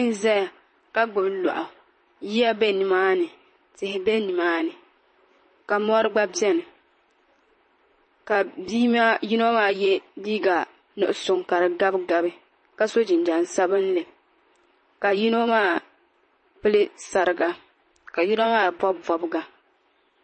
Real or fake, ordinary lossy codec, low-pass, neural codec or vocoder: fake; MP3, 32 kbps; 10.8 kHz; vocoder, 44.1 kHz, 128 mel bands, Pupu-Vocoder